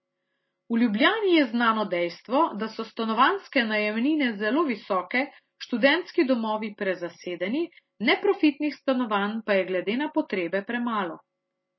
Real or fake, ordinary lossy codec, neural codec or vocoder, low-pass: real; MP3, 24 kbps; none; 7.2 kHz